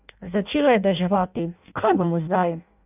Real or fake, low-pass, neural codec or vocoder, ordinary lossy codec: fake; 3.6 kHz; codec, 16 kHz in and 24 kHz out, 0.6 kbps, FireRedTTS-2 codec; none